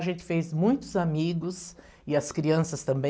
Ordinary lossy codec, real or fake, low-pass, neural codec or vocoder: none; real; none; none